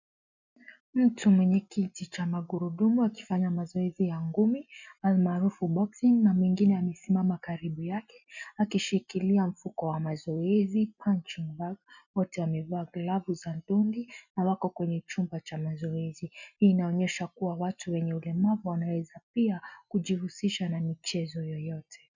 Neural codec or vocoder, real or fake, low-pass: none; real; 7.2 kHz